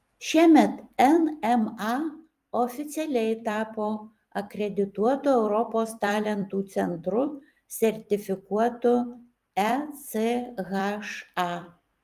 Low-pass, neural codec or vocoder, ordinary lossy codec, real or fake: 14.4 kHz; vocoder, 44.1 kHz, 128 mel bands every 256 samples, BigVGAN v2; Opus, 32 kbps; fake